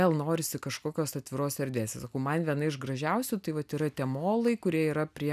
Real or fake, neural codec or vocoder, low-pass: real; none; 14.4 kHz